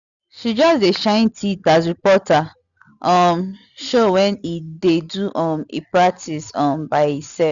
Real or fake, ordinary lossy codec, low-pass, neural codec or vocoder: real; none; 7.2 kHz; none